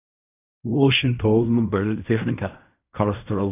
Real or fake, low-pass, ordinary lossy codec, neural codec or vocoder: fake; 3.6 kHz; none; codec, 16 kHz in and 24 kHz out, 0.4 kbps, LongCat-Audio-Codec, fine tuned four codebook decoder